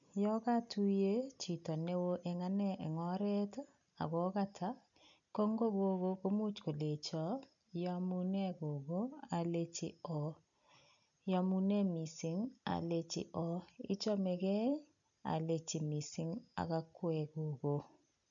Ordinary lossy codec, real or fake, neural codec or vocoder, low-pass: none; real; none; 7.2 kHz